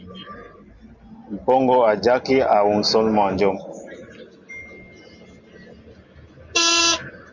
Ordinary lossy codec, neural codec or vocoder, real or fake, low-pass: Opus, 64 kbps; none; real; 7.2 kHz